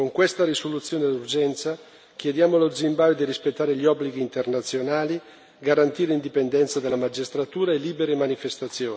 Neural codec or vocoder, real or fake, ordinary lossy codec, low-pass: none; real; none; none